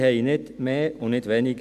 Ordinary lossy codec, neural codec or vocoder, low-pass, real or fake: none; none; 14.4 kHz; real